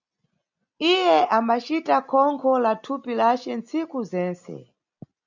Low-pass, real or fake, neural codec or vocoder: 7.2 kHz; real; none